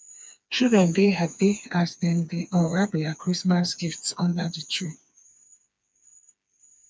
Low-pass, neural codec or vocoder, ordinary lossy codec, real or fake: none; codec, 16 kHz, 4 kbps, FreqCodec, smaller model; none; fake